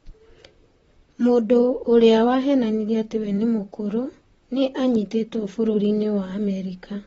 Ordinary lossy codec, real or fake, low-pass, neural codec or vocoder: AAC, 24 kbps; fake; 19.8 kHz; vocoder, 44.1 kHz, 128 mel bands, Pupu-Vocoder